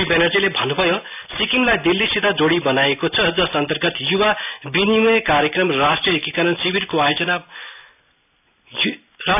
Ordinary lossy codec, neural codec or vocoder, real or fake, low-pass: none; none; real; 3.6 kHz